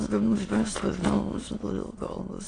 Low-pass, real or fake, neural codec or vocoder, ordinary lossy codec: 9.9 kHz; fake; autoencoder, 22.05 kHz, a latent of 192 numbers a frame, VITS, trained on many speakers; AAC, 32 kbps